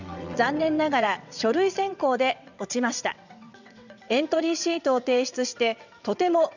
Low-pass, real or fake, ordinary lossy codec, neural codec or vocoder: 7.2 kHz; fake; none; vocoder, 22.05 kHz, 80 mel bands, WaveNeXt